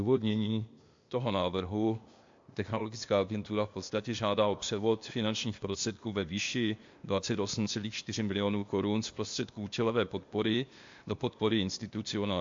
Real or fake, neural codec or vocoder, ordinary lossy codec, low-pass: fake; codec, 16 kHz, 0.8 kbps, ZipCodec; MP3, 48 kbps; 7.2 kHz